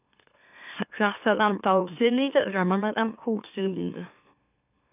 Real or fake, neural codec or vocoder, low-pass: fake; autoencoder, 44.1 kHz, a latent of 192 numbers a frame, MeloTTS; 3.6 kHz